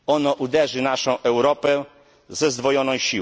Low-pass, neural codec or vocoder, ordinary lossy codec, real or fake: none; none; none; real